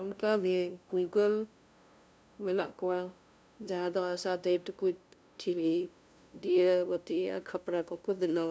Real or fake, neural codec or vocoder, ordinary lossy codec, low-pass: fake; codec, 16 kHz, 0.5 kbps, FunCodec, trained on LibriTTS, 25 frames a second; none; none